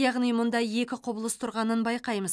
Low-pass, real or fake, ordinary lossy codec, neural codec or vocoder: none; real; none; none